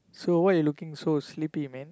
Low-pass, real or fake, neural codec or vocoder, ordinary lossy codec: none; real; none; none